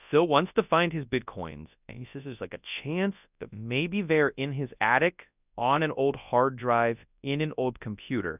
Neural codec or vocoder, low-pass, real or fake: codec, 24 kHz, 0.9 kbps, WavTokenizer, large speech release; 3.6 kHz; fake